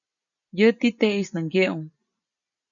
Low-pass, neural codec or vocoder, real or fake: 7.2 kHz; none; real